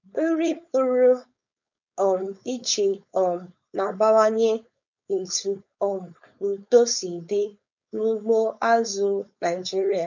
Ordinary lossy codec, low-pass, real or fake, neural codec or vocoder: none; 7.2 kHz; fake; codec, 16 kHz, 4.8 kbps, FACodec